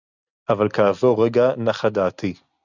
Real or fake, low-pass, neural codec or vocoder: real; 7.2 kHz; none